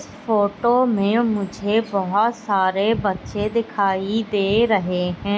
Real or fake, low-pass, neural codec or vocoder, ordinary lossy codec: real; none; none; none